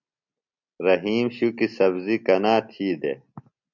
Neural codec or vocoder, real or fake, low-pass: none; real; 7.2 kHz